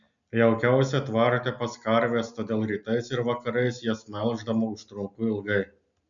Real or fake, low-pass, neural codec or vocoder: real; 7.2 kHz; none